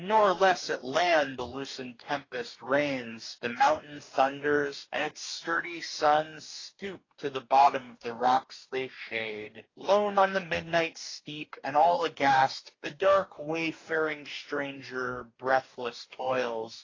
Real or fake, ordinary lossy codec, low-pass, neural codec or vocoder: fake; AAC, 32 kbps; 7.2 kHz; codec, 44.1 kHz, 2.6 kbps, DAC